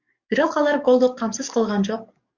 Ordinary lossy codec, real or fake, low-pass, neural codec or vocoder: Opus, 64 kbps; fake; 7.2 kHz; codec, 44.1 kHz, 7.8 kbps, DAC